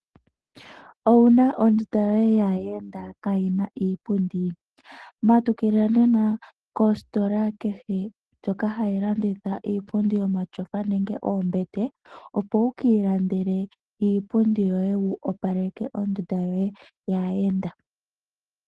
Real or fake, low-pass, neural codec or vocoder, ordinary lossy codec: real; 10.8 kHz; none; Opus, 16 kbps